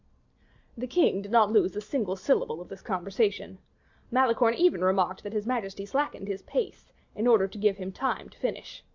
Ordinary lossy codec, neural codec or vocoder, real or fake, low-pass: MP3, 64 kbps; none; real; 7.2 kHz